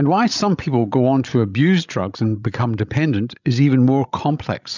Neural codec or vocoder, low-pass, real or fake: codec, 16 kHz, 16 kbps, FreqCodec, larger model; 7.2 kHz; fake